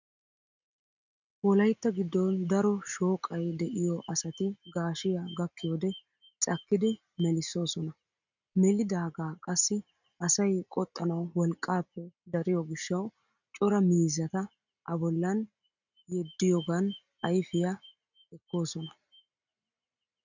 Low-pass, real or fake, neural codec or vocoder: 7.2 kHz; real; none